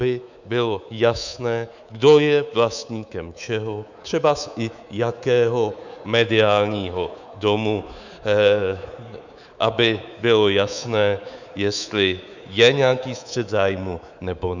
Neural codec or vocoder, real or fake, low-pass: codec, 24 kHz, 3.1 kbps, DualCodec; fake; 7.2 kHz